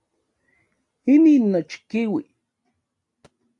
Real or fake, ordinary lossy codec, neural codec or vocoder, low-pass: real; AAC, 48 kbps; none; 10.8 kHz